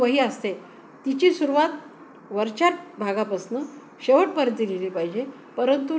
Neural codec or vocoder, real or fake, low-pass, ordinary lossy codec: none; real; none; none